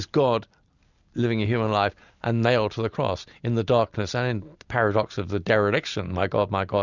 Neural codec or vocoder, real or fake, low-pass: none; real; 7.2 kHz